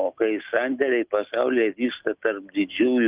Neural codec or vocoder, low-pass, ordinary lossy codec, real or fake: none; 3.6 kHz; Opus, 32 kbps; real